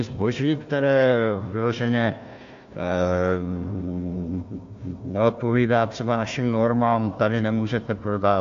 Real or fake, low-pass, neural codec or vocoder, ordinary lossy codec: fake; 7.2 kHz; codec, 16 kHz, 1 kbps, FunCodec, trained on Chinese and English, 50 frames a second; AAC, 64 kbps